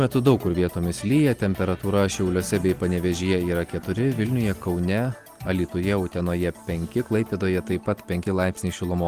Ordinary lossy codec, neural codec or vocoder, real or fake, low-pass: Opus, 24 kbps; none; real; 14.4 kHz